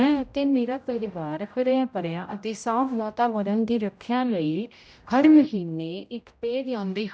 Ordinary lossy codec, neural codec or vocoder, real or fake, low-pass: none; codec, 16 kHz, 0.5 kbps, X-Codec, HuBERT features, trained on general audio; fake; none